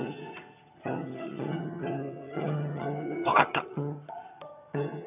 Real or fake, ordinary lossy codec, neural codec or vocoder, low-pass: fake; none; vocoder, 22.05 kHz, 80 mel bands, HiFi-GAN; 3.6 kHz